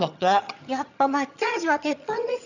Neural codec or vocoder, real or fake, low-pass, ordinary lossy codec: vocoder, 22.05 kHz, 80 mel bands, HiFi-GAN; fake; 7.2 kHz; none